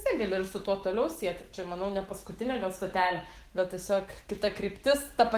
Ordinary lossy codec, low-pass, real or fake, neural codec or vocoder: Opus, 16 kbps; 14.4 kHz; fake; autoencoder, 48 kHz, 128 numbers a frame, DAC-VAE, trained on Japanese speech